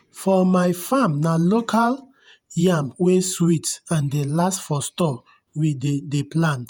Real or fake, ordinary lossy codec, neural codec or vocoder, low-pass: fake; none; vocoder, 48 kHz, 128 mel bands, Vocos; none